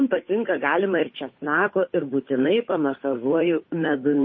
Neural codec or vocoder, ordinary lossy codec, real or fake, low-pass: codec, 16 kHz, 8 kbps, FunCodec, trained on Chinese and English, 25 frames a second; MP3, 24 kbps; fake; 7.2 kHz